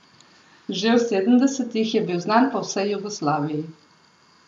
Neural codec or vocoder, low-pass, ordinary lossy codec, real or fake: none; none; none; real